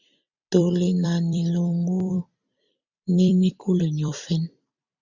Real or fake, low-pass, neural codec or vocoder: fake; 7.2 kHz; vocoder, 44.1 kHz, 128 mel bands every 256 samples, BigVGAN v2